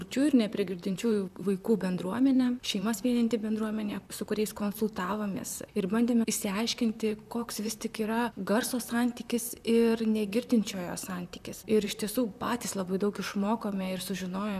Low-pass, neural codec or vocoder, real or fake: 14.4 kHz; vocoder, 44.1 kHz, 128 mel bands, Pupu-Vocoder; fake